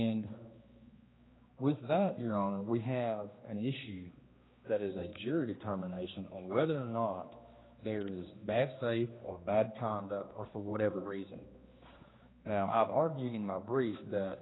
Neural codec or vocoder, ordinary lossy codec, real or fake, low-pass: codec, 16 kHz, 2 kbps, X-Codec, HuBERT features, trained on general audio; AAC, 16 kbps; fake; 7.2 kHz